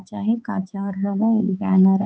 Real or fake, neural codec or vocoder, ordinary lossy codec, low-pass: fake; codec, 16 kHz, 4 kbps, X-Codec, HuBERT features, trained on balanced general audio; none; none